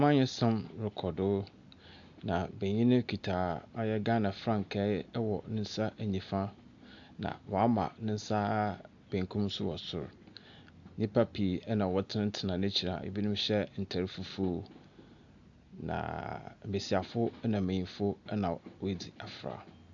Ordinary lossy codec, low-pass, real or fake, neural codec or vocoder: AAC, 64 kbps; 7.2 kHz; real; none